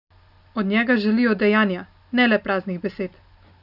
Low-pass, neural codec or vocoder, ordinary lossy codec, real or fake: 5.4 kHz; none; none; real